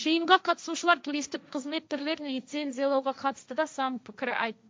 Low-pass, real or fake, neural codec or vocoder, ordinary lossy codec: none; fake; codec, 16 kHz, 1.1 kbps, Voila-Tokenizer; none